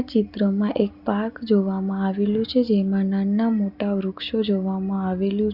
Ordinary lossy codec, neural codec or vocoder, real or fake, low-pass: Opus, 64 kbps; none; real; 5.4 kHz